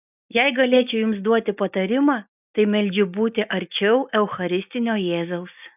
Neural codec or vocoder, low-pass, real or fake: none; 3.6 kHz; real